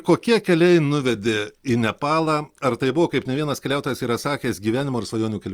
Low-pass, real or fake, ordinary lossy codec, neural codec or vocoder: 19.8 kHz; real; Opus, 32 kbps; none